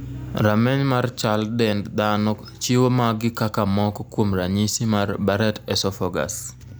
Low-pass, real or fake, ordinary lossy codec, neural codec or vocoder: none; real; none; none